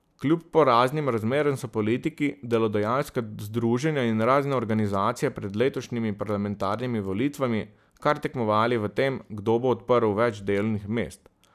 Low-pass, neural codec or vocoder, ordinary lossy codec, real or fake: 14.4 kHz; none; none; real